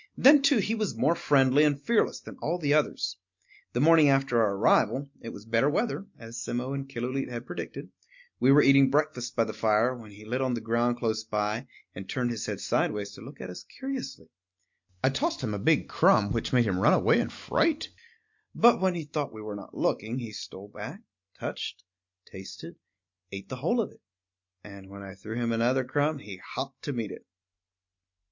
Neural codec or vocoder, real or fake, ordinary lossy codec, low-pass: none; real; MP3, 48 kbps; 7.2 kHz